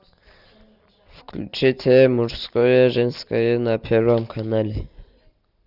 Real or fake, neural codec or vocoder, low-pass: real; none; 5.4 kHz